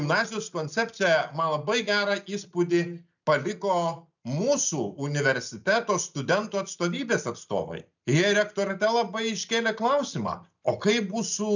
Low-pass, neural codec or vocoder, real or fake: 7.2 kHz; none; real